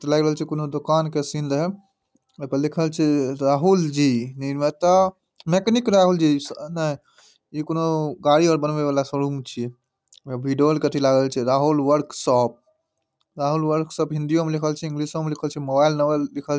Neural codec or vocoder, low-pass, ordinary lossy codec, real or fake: none; none; none; real